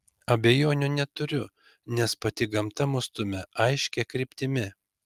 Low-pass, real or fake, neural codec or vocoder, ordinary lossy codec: 14.4 kHz; real; none; Opus, 32 kbps